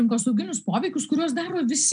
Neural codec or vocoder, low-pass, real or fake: none; 9.9 kHz; real